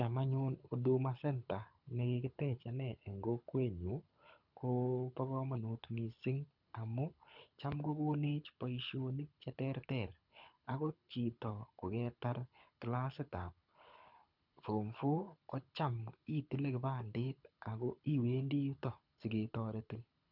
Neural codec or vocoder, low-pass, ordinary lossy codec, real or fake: codec, 24 kHz, 6 kbps, HILCodec; 5.4 kHz; none; fake